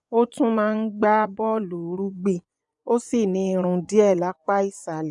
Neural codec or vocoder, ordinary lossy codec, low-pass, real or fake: vocoder, 24 kHz, 100 mel bands, Vocos; none; 10.8 kHz; fake